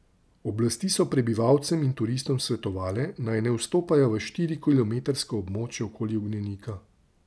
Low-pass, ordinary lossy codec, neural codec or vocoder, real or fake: none; none; none; real